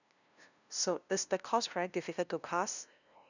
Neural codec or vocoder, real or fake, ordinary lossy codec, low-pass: codec, 16 kHz, 0.5 kbps, FunCodec, trained on LibriTTS, 25 frames a second; fake; none; 7.2 kHz